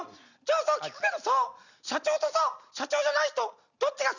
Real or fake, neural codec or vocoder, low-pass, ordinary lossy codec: real; none; 7.2 kHz; none